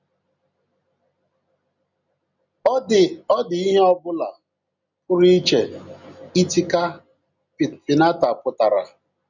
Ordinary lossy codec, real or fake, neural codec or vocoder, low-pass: none; real; none; 7.2 kHz